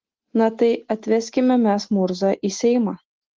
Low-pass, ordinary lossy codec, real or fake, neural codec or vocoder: 7.2 kHz; Opus, 16 kbps; real; none